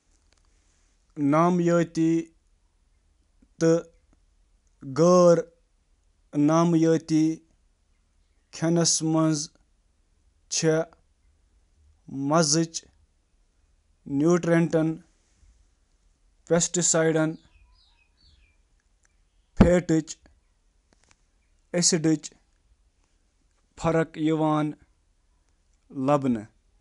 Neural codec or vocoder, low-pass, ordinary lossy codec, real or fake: none; 10.8 kHz; none; real